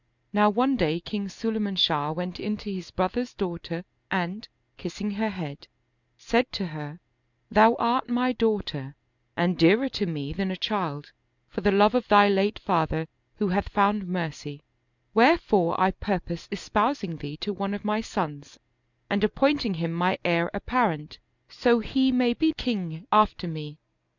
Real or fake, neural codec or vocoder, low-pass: real; none; 7.2 kHz